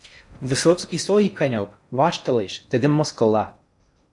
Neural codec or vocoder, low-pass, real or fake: codec, 16 kHz in and 24 kHz out, 0.6 kbps, FocalCodec, streaming, 4096 codes; 10.8 kHz; fake